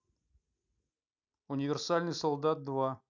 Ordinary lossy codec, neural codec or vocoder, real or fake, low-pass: none; none; real; 7.2 kHz